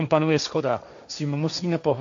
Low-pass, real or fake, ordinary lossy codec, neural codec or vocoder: 7.2 kHz; fake; AAC, 64 kbps; codec, 16 kHz, 1.1 kbps, Voila-Tokenizer